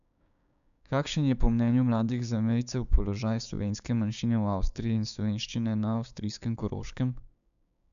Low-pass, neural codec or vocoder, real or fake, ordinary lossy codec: 7.2 kHz; codec, 16 kHz, 6 kbps, DAC; fake; none